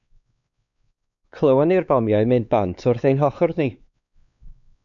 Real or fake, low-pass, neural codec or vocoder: fake; 7.2 kHz; codec, 16 kHz, 4 kbps, X-Codec, WavLM features, trained on Multilingual LibriSpeech